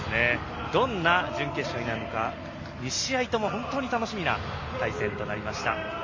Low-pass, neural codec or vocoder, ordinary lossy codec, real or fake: 7.2 kHz; none; MP3, 32 kbps; real